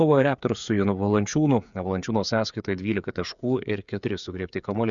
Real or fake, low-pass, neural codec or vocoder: fake; 7.2 kHz; codec, 16 kHz, 8 kbps, FreqCodec, smaller model